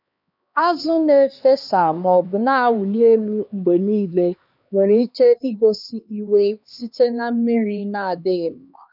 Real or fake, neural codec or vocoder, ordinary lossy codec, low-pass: fake; codec, 16 kHz, 2 kbps, X-Codec, HuBERT features, trained on LibriSpeech; none; 5.4 kHz